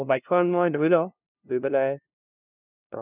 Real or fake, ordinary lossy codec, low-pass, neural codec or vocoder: fake; none; 3.6 kHz; codec, 16 kHz, 0.5 kbps, FunCodec, trained on LibriTTS, 25 frames a second